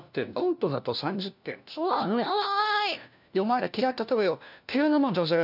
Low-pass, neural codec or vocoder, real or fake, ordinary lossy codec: 5.4 kHz; codec, 16 kHz, 1 kbps, FunCodec, trained on LibriTTS, 50 frames a second; fake; none